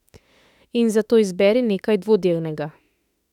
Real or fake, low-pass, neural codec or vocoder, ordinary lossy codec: fake; 19.8 kHz; autoencoder, 48 kHz, 32 numbers a frame, DAC-VAE, trained on Japanese speech; none